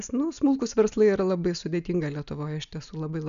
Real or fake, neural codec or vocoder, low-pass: real; none; 7.2 kHz